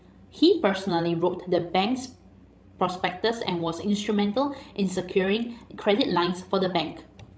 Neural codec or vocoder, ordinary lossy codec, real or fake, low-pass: codec, 16 kHz, 16 kbps, FreqCodec, larger model; none; fake; none